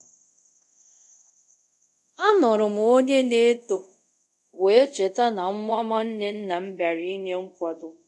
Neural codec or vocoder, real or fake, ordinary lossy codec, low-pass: codec, 24 kHz, 0.5 kbps, DualCodec; fake; none; none